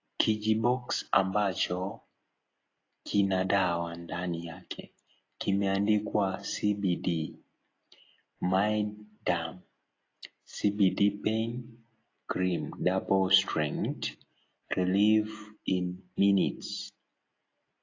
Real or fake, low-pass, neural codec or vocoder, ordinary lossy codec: real; 7.2 kHz; none; AAC, 32 kbps